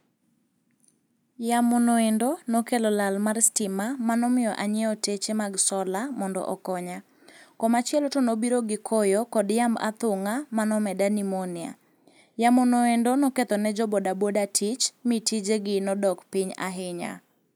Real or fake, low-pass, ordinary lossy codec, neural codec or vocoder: real; none; none; none